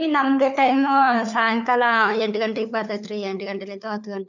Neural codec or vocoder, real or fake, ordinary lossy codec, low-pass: codec, 16 kHz, 4 kbps, FunCodec, trained on LibriTTS, 50 frames a second; fake; none; 7.2 kHz